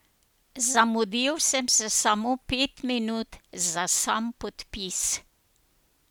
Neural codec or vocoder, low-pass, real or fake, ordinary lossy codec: none; none; real; none